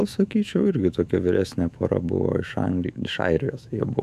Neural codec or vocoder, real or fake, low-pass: autoencoder, 48 kHz, 128 numbers a frame, DAC-VAE, trained on Japanese speech; fake; 14.4 kHz